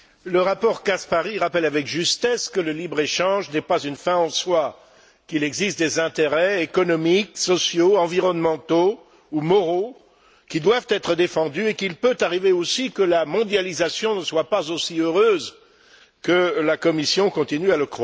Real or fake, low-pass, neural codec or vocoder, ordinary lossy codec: real; none; none; none